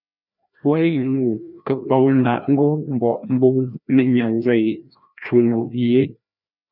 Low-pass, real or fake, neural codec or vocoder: 5.4 kHz; fake; codec, 16 kHz, 1 kbps, FreqCodec, larger model